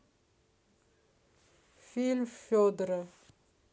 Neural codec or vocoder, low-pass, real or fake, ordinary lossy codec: none; none; real; none